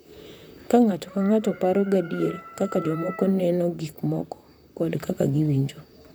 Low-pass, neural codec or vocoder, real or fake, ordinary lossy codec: none; vocoder, 44.1 kHz, 128 mel bands, Pupu-Vocoder; fake; none